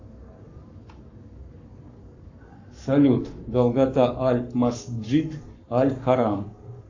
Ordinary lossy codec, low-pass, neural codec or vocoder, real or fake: AAC, 48 kbps; 7.2 kHz; codec, 44.1 kHz, 7.8 kbps, Pupu-Codec; fake